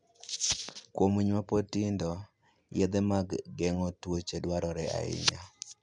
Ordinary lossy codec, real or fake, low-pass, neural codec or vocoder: MP3, 96 kbps; real; 9.9 kHz; none